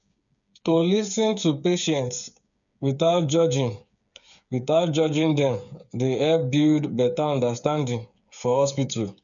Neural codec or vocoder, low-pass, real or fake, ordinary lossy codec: codec, 16 kHz, 8 kbps, FreqCodec, smaller model; 7.2 kHz; fake; none